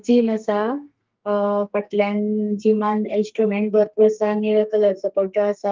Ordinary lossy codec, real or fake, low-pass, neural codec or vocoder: Opus, 32 kbps; fake; 7.2 kHz; codec, 32 kHz, 1.9 kbps, SNAC